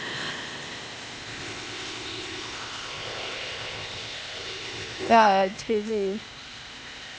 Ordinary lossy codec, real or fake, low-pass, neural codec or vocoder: none; fake; none; codec, 16 kHz, 0.8 kbps, ZipCodec